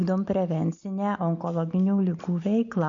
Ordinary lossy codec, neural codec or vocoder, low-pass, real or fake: MP3, 96 kbps; none; 7.2 kHz; real